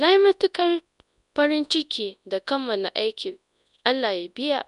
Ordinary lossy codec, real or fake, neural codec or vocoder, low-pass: none; fake; codec, 24 kHz, 0.9 kbps, WavTokenizer, large speech release; 10.8 kHz